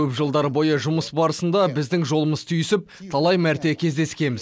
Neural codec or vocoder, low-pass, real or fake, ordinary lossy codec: none; none; real; none